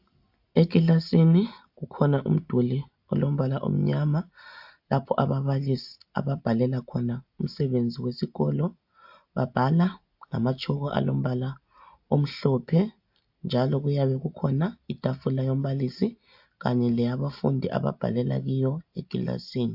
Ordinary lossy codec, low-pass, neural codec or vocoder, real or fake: AAC, 48 kbps; 5.4 kHz; none; real